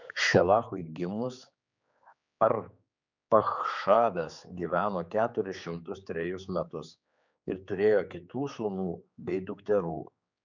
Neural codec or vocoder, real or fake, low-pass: codec, 16 kHz, 4 kbps, X-Codec, HuBERT features, trained on general audio; fake; 7.2 kHz